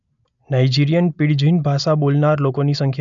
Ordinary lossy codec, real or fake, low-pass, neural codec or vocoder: none; real; 7.2 kHz; none